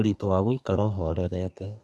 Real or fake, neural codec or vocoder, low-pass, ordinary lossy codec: fake; codec, 24 kHz, 1 kbps, SNAC; none; none